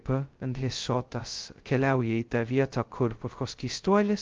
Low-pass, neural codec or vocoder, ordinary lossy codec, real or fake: 7.2 kHz; codec, 16 kHz, 0.2 kbps, FocalCodec; Opus, 32 kbps; fake